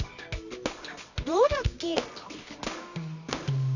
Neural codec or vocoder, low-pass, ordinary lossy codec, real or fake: codec, 24 kHz, 0.9 kbps, WavTokenizer, medium music audio release; 7.2 kHz; none; fake